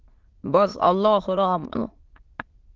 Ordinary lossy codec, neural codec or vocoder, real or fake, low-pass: Opus, 16 kbps; autoencoder, 22.05 kHz, a latent of 192 numbers a frame, VITS, trained on many speakers; fake; 7.2 kHz